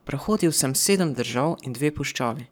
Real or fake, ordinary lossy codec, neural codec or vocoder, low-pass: fake; none; codec, 44.1 kHz, 7.8 kbps, Pupu-Codec; none